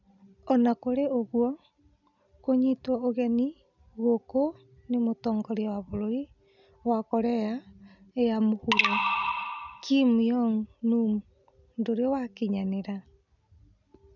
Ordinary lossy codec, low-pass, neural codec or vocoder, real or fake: none; 7.2 kHz; none; real